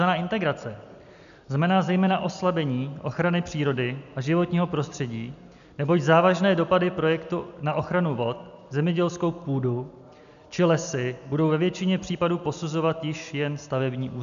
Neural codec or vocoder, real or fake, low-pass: none; real; 7.2 kHz